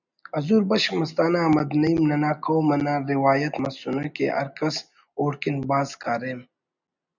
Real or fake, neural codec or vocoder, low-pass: real; none; 7.2 kHz